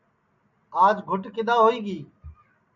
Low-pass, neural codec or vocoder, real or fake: 7.2 kHz; none; real